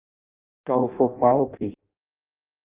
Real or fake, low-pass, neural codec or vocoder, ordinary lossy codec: fake; 3.6 kHz; codec, 16 kHz in and 24 kHz out, 0.6 kbps, FireRedTTS-2 codec; Opus, 32 kbps